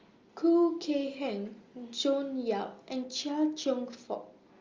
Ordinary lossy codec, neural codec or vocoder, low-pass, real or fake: Opus, 32 kbps; none; 7.2 kHz; real